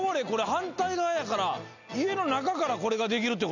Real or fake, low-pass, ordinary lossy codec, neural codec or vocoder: real; 7.2 kHz; none; none